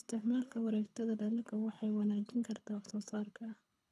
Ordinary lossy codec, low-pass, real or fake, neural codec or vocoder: none; none; fake; codec, 24 kHz, 6 kbps, HILCodec